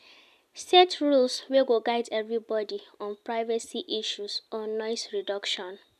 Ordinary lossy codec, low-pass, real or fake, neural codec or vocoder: none; 14.4 kHz; real; none